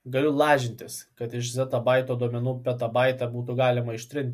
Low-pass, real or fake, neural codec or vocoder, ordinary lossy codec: 14.4 kHz; real; none; MP3, 64 kbps